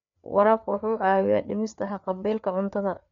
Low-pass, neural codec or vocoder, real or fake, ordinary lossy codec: 7.2 kHz; codec, 16 kHz, 4 kbps, FreqCodec, larger model; fake; none